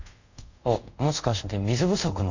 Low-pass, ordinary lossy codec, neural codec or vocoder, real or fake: 7.2 kHz; none; codec, 24 kHz, 0.5 kbps, DualCodec; fake